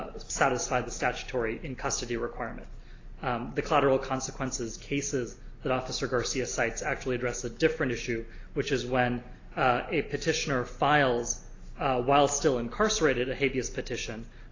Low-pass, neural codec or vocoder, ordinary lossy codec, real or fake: 7.2 kHz; none; AAC, 32 kbps; real